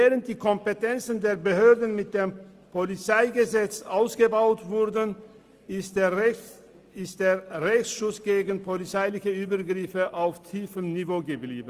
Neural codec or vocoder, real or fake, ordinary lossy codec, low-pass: none; real; Opus, 32 kbps; 14.4 kHz